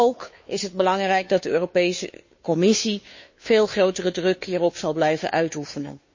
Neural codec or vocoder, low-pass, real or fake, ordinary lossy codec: codec, 16 kHz, 2 kbps, FunCodec, trained on Chinese and English, 25 frames a second; 7.2 kHz; fake; MP3, 32 kbps